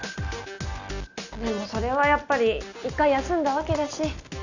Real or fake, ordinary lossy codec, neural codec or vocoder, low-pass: real; none; none; 7.2 kHz